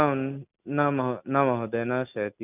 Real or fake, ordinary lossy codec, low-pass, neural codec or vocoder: real; none; 3.6 kHz; none